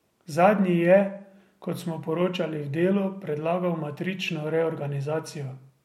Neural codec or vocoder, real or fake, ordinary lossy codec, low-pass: none; real; MP3, 64 kbps; 19.8 kHz